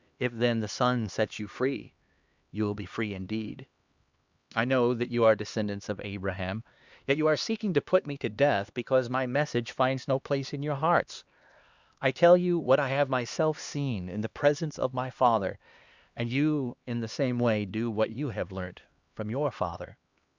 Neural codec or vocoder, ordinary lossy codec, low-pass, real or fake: codec, 16 kHz, 2 kbps, X-Codec, HuBERT features, trained on LibriSpeech; Opus, 64 kbps; 7.2 kHz; fake